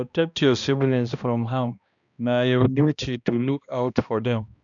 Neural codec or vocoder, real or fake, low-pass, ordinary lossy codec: codec, 16 kHz, 1 kbps, X-Codec, HuBERT features, trained on balanced general audio; fake; 7.2 kHz; none